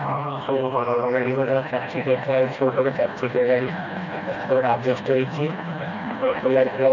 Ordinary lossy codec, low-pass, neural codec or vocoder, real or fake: none; 7.2 kHz; codec, 16 kHz, 1 kbps, FreqCodec, smaller model; fake